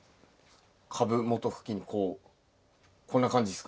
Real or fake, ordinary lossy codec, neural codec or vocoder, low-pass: real; none; none; none